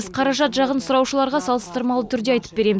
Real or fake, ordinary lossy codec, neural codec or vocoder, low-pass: real; none; none; none